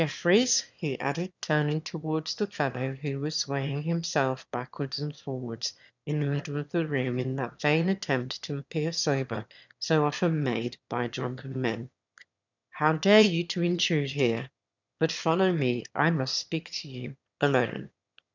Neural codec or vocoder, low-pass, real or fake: autoencoder, 22.05 kHz, a latent of 192 numbers a frame, VITS, trained on one speaker; 7.2 kHz; fake